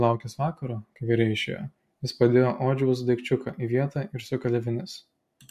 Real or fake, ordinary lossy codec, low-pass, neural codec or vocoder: real; MP3, 64 kbps; 14.4 kHz; none